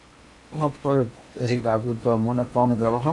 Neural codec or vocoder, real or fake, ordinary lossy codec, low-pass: codec, 16 kHz in and 24 kHz out, 0.8 kbps, FocalCodec, streaming, 65536 codes; fake; AAC, 64 kbps; 10.8 kHz